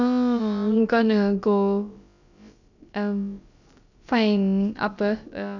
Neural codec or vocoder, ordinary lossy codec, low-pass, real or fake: codec, 16 kHz, about 1 kbps, DyCAST, with the encoder's durations; none; 7.2 kHz; fake